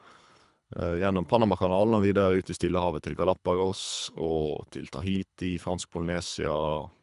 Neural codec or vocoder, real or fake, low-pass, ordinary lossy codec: codec, 24 kHz, 3 kbps, HILCodec; fake; 10.8 kHz; none